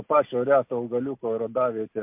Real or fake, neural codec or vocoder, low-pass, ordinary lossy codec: real; none; 3.6 kHz; MP3, 32 kbps